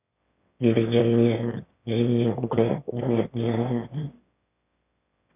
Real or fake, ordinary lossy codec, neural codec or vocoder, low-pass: fake; none; autoencoder, 22.05 kHz, a latent of 192 numbers a frame, VITS, trained on one speaker; 3.6 kHz